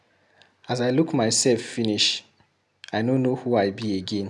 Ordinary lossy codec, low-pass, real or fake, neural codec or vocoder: none; none; real; none